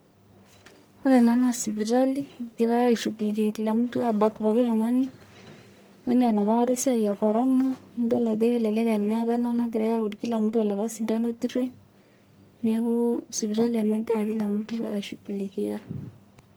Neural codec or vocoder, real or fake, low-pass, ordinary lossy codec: codec, 44.1 kHz, 1.7 kbps, Pupu-Codec; fake; none; none